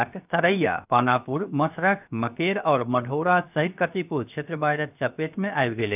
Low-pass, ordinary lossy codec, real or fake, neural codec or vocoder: 3.6 kHz; none; fake; codec, 16 kHz, 0.7 kbps, FocalCodec